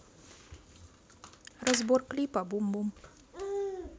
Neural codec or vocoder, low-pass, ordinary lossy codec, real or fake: none; none; none; real